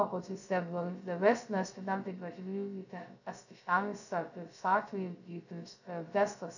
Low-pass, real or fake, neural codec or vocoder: 7.2 kHz; fake; codec, 16 kHz, 0.2 kbps, FocalCodec